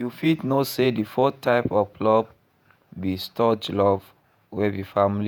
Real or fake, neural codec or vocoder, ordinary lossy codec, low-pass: fake; vocoder, 48 kHz, 128 mel bands, Vocos; none; none